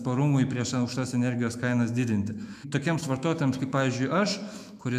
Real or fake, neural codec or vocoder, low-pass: fake; autoencoder, 48 kHz, 128 numbers a frame, DAC-VAE, trained on Japanese speech; 14.4 kHz